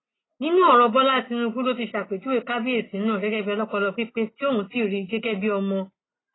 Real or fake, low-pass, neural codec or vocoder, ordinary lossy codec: real; 7.2 kHz; none; AAC, 16 kbps